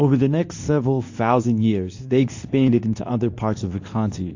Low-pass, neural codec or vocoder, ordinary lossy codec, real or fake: 7.2 kHz; codec, 24 kHz, 0.9 kbps, WavTokenizer, medium speech release version 2; AAC, 48 kbps; fake